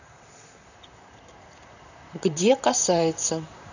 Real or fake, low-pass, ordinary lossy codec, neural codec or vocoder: fake; 7.2 kHz; none; vocoder, 44.1 kHz, 128 mel bands, Pupu-Vocoder